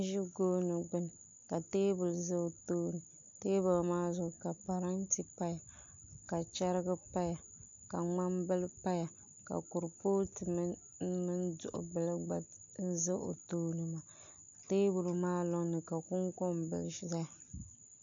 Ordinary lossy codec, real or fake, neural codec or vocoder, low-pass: MP3, 48 kbps; real; none; 7.2 kHz